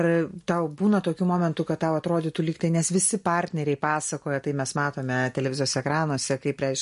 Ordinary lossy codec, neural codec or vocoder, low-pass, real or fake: MP3, 48 kbps; none; 14.4 kHz; real